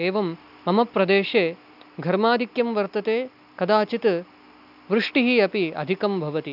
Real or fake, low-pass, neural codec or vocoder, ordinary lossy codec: real; 5.4 kHz; none; none